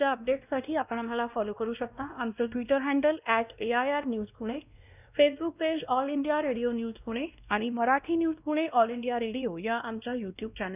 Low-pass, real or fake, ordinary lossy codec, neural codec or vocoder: 3.6 kHz; fake; none; codec, 16 kHz, 1 kbps, X-Codec, WavLM features, trained on Multilingual LibriSpeech